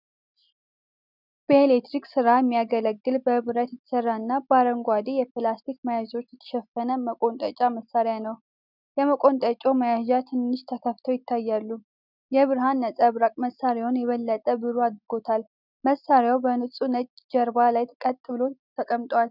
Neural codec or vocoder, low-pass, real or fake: none; 5.4 kHz; real